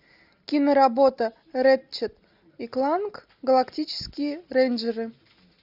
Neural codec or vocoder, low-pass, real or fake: none; 5.4 kHz; real